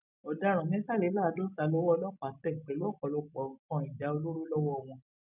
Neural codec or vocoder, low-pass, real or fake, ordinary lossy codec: none; 3.6 kHz; real; none